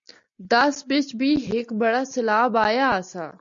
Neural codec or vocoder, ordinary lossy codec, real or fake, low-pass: none; AAC, 64 kbps; real; 7.2 kHz